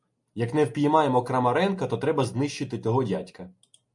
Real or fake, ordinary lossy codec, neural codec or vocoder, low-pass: real; MP3, 64 kbps; none; 10.8 kHz